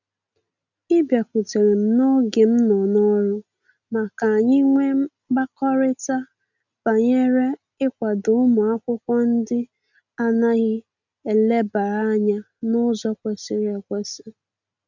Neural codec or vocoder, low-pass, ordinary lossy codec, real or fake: none; 7.2 kHz; none; real